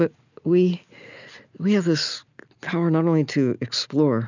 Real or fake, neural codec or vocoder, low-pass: real; none; 7.2 kHz